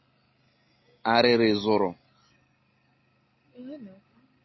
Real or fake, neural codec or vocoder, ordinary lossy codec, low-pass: real; none; MP3, 24 kbps; 7.2 kHz